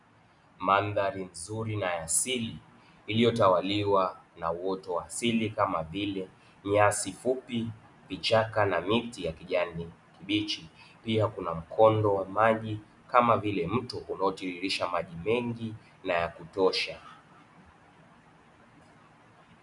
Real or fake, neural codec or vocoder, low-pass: real; none; 10.8 kHz